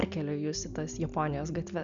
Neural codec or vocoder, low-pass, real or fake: none; 7.2 kHz; real